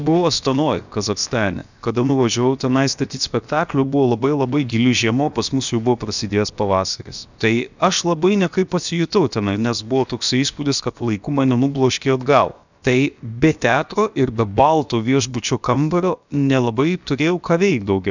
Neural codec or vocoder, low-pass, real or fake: codec, 16 kHz, about 1 kbps, DyCAST, with the encoder's durations; 7.2 kHz; fake